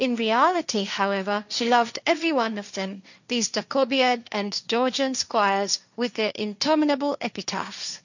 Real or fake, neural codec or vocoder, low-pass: fake; codec, 16 kHz, 1.1 kbps, Voila-Tokenizer; 7.2 kHz